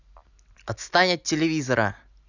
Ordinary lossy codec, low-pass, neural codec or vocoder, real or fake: none; 7.2 kHz; none; real